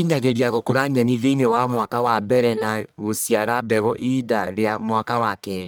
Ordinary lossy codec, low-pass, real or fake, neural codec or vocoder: none; none; fake; codec, 44.1 kHz, 1.7 kbps, Pupu-Codec